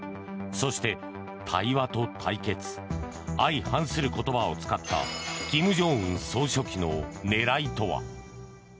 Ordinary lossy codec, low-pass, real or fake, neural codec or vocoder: none; none; real; none